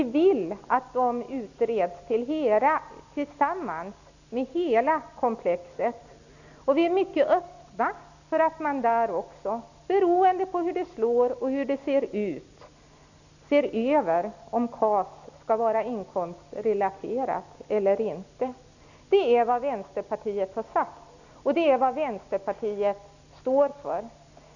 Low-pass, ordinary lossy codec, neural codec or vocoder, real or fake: 7.2 kHz; none; none; real